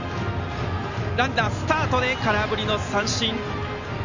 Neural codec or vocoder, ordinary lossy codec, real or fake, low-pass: none; none; real; 7.2 kHz